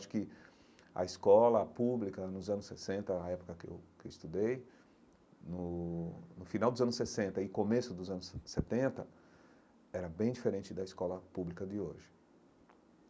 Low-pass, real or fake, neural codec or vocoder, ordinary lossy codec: none; real; none; none